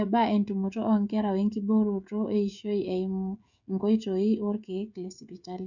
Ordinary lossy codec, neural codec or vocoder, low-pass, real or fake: none; codec, 16 kHz, 16 kbps, FreqCodec, smaller model; 7.2 kHz; fake